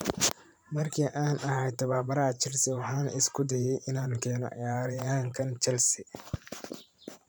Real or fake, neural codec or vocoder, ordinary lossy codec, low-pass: fake; vocoder, 44.1 kHz, 128 mel bands every 512 samples, BigVGAN v2; none; none